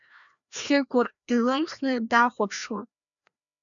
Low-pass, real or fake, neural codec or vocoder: 7.2 kHz; fake; codec, 16 kHz, 1 kbps, FreqCodec, larger model